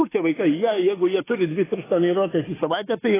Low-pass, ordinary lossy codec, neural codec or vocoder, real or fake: 3.6 kHz; AAC, 16 kbps; autoencoder, 48 kHz, 32 numbers a frame, DAC-VAE, trained on Japanese speech; fake